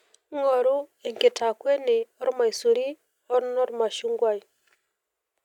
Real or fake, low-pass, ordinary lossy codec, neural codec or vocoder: real; 19.8 kHz; none; none